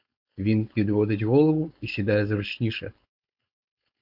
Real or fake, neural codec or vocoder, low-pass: fake; codec, 16 kHz, 4.8 kbps, FACodec; 5.4 kHz